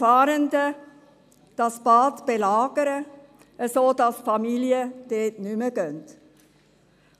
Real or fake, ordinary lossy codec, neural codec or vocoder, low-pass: real; none; none; 14.4 kHz